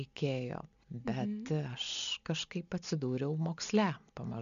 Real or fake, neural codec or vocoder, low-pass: real; none; 7.2 kHz